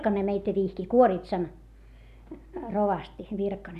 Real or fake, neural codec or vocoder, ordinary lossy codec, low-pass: real; none; none; 14.4 kHz